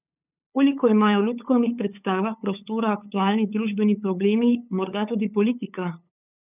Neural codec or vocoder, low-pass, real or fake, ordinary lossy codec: codec, 16 kHz, 8 kbps, FunCodec, trained on LibriTTS, 25 frames a second; 3.6 kHz; fake; none